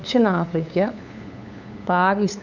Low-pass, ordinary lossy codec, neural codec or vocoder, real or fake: 7.2 kHz; none; codec, 16 kHz, 8 kbps, FunCodec, trained on LibriTTS, 25 frames a second; fake